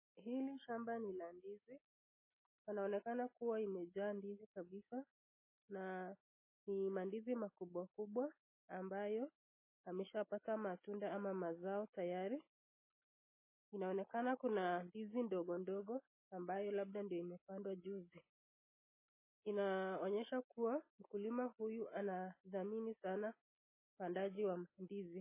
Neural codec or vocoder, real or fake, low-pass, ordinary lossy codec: none; real; 3.6 kHz; MP3, 16 kbps